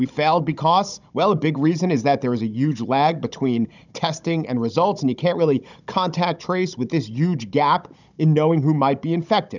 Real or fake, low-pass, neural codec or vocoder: fake; 7.2 kHz; codec, 16 kHz, 16 kbps, FunCodec, trained on Chinese and English, 50 frames a second